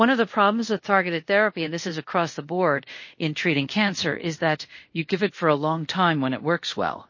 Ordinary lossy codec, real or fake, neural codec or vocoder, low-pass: MP3, 32 kbps; fake; codec, 24 kHz, 0.5 kbps, DualCodec; 7.2 kHz